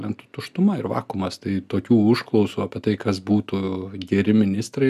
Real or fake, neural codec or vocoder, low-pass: real; none; 14.4 kHz